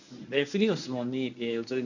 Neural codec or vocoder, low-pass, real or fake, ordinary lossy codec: codec, 24 kHz, 0.9 kbps, WavTokenizer, medium speech release version 1; 7.2 kHz; fake; none